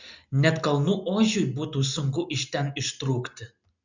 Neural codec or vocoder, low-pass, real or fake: none; 7.2 kHz; real